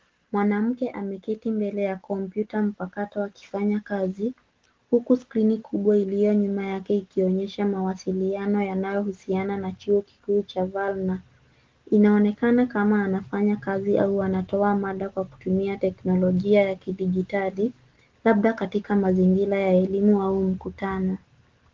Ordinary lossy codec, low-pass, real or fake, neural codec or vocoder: Opus, 16 kbps; 7.2 kHz; real; none